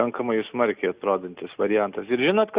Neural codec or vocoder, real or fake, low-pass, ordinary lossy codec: none; real; 3.6 kHz; Opus, 64 kbps